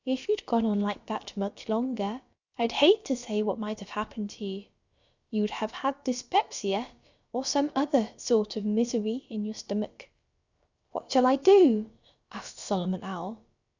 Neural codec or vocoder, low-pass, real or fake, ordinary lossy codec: codec, 16 kHz, about 1 kbps, DyCAST, with the encoder's durations; 7.2 kHz; fake; Opus, 64 kbps